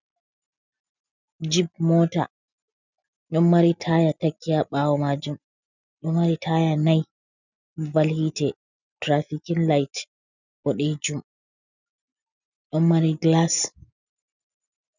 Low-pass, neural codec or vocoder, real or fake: 7.2 kHz; none; real